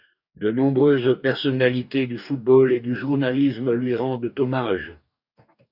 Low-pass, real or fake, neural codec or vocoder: 5.4 kHz; fake; codec, 44.1 kHz, 2.6 kbps, DAC